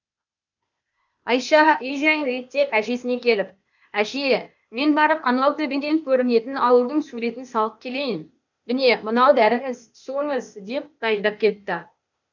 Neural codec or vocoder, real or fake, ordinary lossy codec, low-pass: codec, 16 kHz, 0.8 kbps, ZipCodec; fake; none; 7.2 kHz